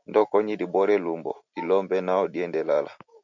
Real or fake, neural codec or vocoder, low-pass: real; none; 7.2 kHz